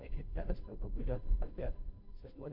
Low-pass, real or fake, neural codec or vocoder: 5.4 kHz; fake; codec, 16 kHz, 0.5 kbps, FunCodec, trained on Chinese and English, 25 frames a second